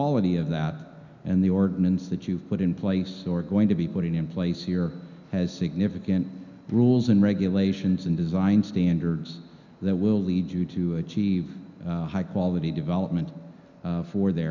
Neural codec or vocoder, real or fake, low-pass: none; real; 7.2 kHz